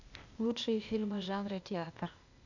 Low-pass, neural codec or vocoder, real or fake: 7.2 kHz; codec, 16 kHz, 0.8 kbps, ZipCodec; fake